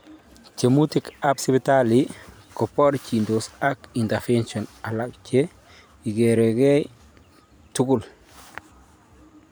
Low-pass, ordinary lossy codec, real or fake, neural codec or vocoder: none; none; fake; vocoder, 44.1 kHz, 128 mel bands every 512 samples, BigVGAN v2